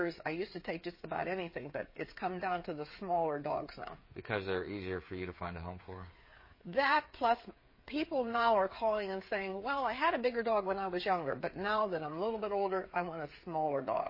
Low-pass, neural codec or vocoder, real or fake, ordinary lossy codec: 5.4 kHz; codec, 16 kHz, 8 kbps, FreqCodec, smaller model; fake; MP3, 24 kbps